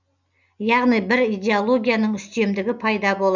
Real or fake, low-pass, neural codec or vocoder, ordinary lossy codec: real; 7.2 kHz; none; none